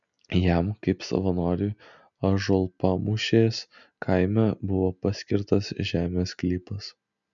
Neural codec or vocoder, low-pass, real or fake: none; 7.2 kHz; real